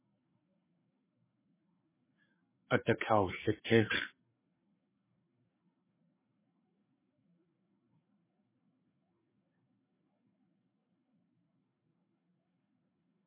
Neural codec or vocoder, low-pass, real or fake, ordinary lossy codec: codec, 16 kHz, 4 kbps, FreqCodec, larger model; 3.6 kHz; fake; MP3, 16 kbps